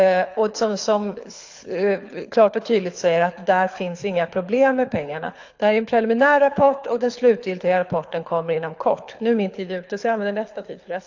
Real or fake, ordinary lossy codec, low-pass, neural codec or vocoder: fake; AAC, 48 kbps; 7.2 kHz; codec, 24 kHz, 6 kbps, HILCodec